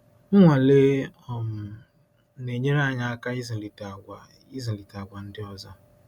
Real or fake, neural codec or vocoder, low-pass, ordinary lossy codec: real; none; 19.8 kHz; none